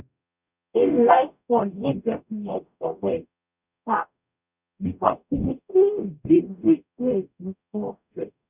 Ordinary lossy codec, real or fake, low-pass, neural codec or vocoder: none; fake; 3.6 kHz; codec, 44.1 kHz, 0.9 kbps, DAC